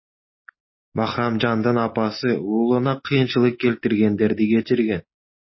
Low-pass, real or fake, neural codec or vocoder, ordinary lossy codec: 7.2 kHz; real; none; MP3, 24 kbps